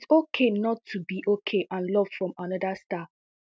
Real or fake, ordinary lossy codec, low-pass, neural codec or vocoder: real; none; none; none